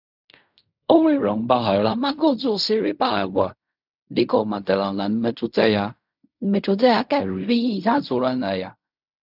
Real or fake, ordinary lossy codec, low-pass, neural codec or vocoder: fake; none; 5.4 kHz; codec, 16 kHz in and 24 kHz out, 0.4 kbps, LongCat-Audio-Codec, fine tuned four codebook decoder